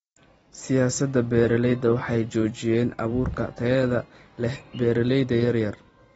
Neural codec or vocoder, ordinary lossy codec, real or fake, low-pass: vocoder, 44.1 kHz, 128 mel bands every 256 samples, BigVGAN v2; AAC, 24 kbps; fake; 19.8 kHz